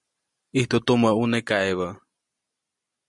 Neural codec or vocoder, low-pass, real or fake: none; 10.8 kHz; real